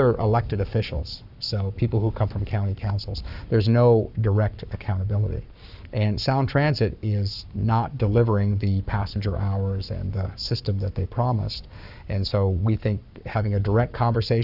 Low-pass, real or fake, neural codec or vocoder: 5.4 kHz; fake; codec, 44.1 kHz, 7.8 kbps, Pupu-Codec